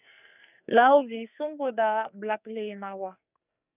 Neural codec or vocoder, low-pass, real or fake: codec, 44.1 kHz, 2.6 kbps, SNAC; 3.6 kHz; fake